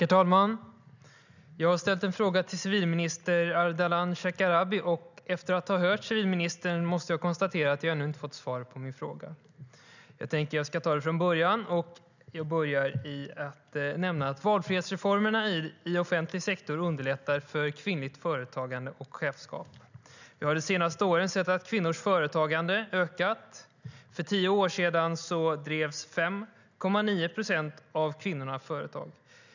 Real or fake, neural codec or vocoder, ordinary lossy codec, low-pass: real; none; none; 7.2 kHz